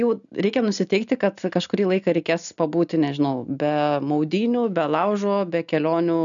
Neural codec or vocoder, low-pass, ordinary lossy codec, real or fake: none; 7.2 kHz; MP3, 96 kbps; real